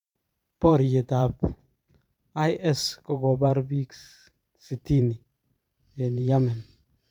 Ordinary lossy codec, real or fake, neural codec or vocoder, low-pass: none; fake; vocoder, 44.1 kHz, 128 mel bands every 512 samples, BigVGAN v2; 19.8 kHz